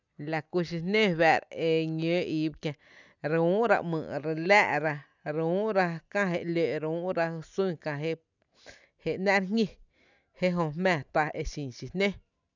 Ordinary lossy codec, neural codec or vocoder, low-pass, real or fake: none; none; 7.2 kHz; real